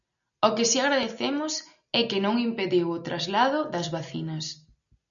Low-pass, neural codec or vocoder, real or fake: 7.2 kHz; none; real